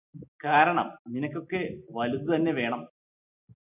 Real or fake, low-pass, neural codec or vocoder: real; 3.6 kHz; none